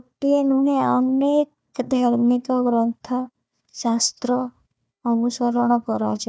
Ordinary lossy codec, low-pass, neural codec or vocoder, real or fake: none; none; codec, 16 kHz, 1 kbps, FunCodec, trained on Chinese and English, 50 frames a second; fake